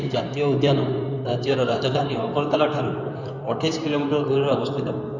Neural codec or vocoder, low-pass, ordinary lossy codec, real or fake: codec, 16 kHz in and 24 kHz out, 2.2 kbps, FireRedTTS-2 codec; 7.2 kHz; none; fake